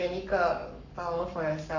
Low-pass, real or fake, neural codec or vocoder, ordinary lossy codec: 7.2 kHz; fake; codec, 44.1 kHz, 7.8 kbps, Pupu-Codec; Opus, 64 kbps